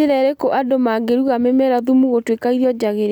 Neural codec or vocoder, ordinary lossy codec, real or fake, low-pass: none; none; real; 19.8 kHz